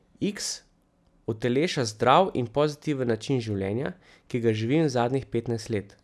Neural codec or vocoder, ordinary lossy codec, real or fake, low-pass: none; none; real; none